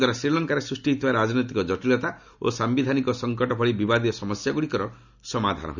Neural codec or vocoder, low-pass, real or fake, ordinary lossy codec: none; 7.2 kHz; real; none